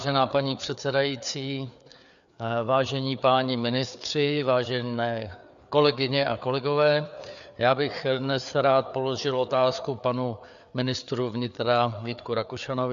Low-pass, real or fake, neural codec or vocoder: 7.2 kHz; fake; codec, 16 kHz, 4 kbps, FreqCodec, larger model